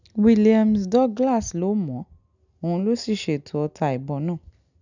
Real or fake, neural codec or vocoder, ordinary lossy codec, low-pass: real; none; none; 7.2 kHz